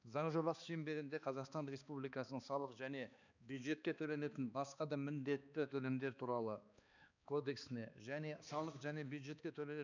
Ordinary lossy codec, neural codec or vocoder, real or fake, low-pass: none; codec, 16 kHz, 2 kbps, X-Codec, HuBERT features, trained on balanced general audio; fake; 7.2 kHz